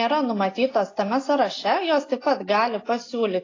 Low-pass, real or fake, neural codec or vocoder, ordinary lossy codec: 7.2 kHz; real; none; AAC, 32 kbps